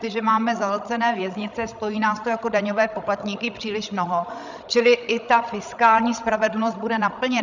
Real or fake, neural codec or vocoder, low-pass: fake; codec, 16 kHz, 16 kbps, FreqCodec, larger model; 7.2 kHz